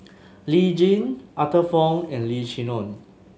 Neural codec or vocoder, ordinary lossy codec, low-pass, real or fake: none; none; none; real